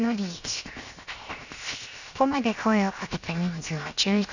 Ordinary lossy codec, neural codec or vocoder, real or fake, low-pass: none; codec, 16 kHz, 0.7 kbps, FocalCodec; fake; 7.2 kHz